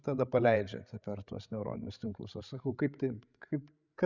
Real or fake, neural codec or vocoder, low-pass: fake; codec, 16 kHz, 16 kbps, FreqCodec, larger model; 7.2 kHz